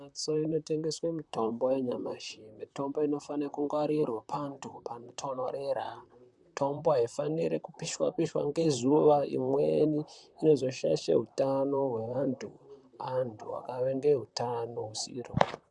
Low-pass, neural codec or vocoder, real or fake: 10.8 kHz; vocoder, 44.1 kHz, 128 mel bands, Pupu-Vocoder; fake